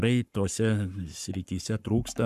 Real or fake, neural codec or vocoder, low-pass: fake; codec, 44.1 kHz, 7.8 kbps, Pupu-Codec; 14.4 kHz